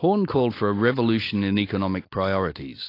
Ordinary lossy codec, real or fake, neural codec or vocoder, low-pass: AAC, 32 kbps; real; none; 5.4 kHz